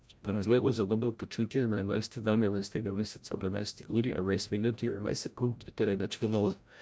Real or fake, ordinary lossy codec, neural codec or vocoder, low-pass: fake; none; codec, 16 kHz, 0.5 kbps, FreqCodec, larger model; none